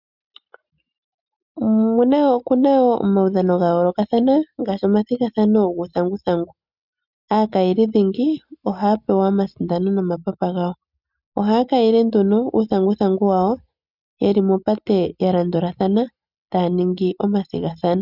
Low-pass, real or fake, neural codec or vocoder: 5.4 kHz; real; none